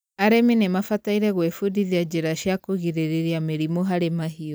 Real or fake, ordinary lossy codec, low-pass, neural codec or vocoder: real; none; none; none